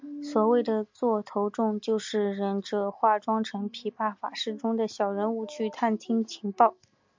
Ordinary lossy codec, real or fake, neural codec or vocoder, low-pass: MP3, 64 kbps; real; none; 7.2 kHz